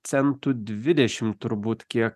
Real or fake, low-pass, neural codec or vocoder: real; 14.4 kHz; none